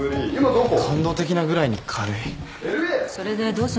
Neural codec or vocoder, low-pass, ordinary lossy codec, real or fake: none; none; none; real